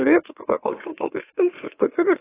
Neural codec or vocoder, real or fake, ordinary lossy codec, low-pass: autoencoder, 44.1 kHz, a latent of 192 numbers a frame, MeloTTS; fake; AAC, 16 kbps; 3.6 kHz